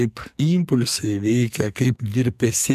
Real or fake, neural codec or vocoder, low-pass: fake; codec, 44.1 kHz, 2.6 kbps, SNAC; 14.4 kHz